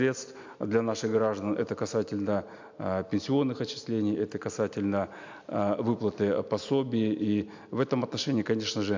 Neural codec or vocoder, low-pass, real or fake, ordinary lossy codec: none; 7.2 kHz; real; MP3, 64 kbps